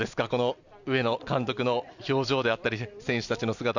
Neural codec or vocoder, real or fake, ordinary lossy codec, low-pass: none; real; none; 7.2 kHz